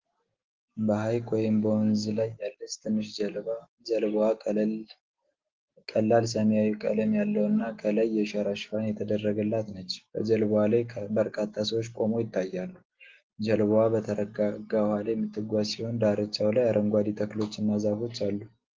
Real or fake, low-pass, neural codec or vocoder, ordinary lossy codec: real; 7.2 kHz; none; Opus, 16 kbps